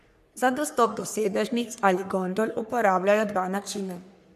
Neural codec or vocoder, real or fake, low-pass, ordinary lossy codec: codec, 44.1 kHz, 3.4 kbps, Pupu-Codec; fake; 14.4 kHz; none